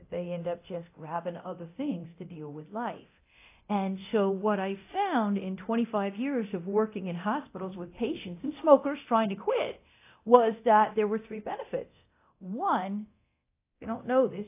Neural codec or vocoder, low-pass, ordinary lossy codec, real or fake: codec, 24 kHz, 0.9 kbps, DualCodec; 3.6 kHz; AAC, 24 kbps; fake